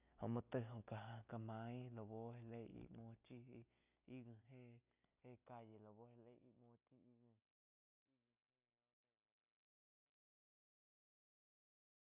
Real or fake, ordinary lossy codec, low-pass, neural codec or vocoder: real; none; 3.6 kHz; none